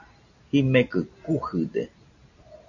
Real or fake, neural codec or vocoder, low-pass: real; none; 7.2 kHz